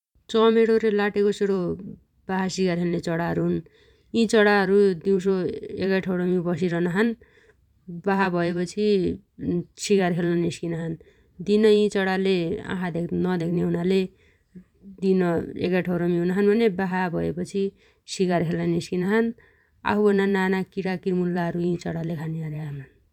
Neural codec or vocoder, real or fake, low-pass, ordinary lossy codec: vocoder, 44.1 kHz, 128 mel bands every 512 samples, BigVGAN v2; fake; 19.8 kHz; none